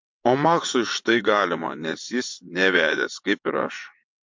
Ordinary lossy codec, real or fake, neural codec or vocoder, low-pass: MP3, 48 kbps; fake; vocoder, 22.05 kHz, 80 mel bands, WaveNeXt; 7.2 kHz